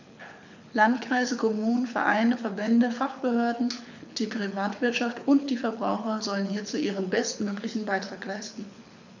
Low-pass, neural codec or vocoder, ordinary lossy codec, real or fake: 7.2 kHz; codec, 24 kHz, 6 kbps, HILCodec; none; fake